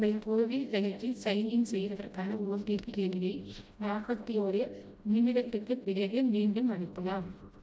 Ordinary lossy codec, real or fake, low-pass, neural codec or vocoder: none; fake; none; codec, 16 kHz, 0.5 kbps, FreqCodec, smaller model